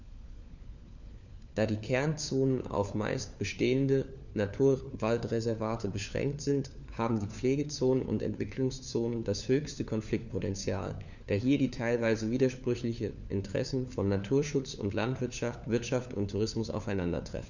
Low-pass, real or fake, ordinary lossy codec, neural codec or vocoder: 7.2 kHz; fake; none; codec, 16 kHz, 4 kbps, FunCodec, trained on LibriTTS, 50 frames a second